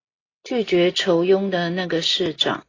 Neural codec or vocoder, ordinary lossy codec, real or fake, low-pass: none; AAC, 32 kbps; real; 7.2 kHz